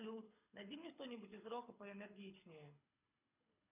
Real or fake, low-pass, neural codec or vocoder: fake; 3.6 kHz; codec, 24 kHz, 6 kbps, HILCodec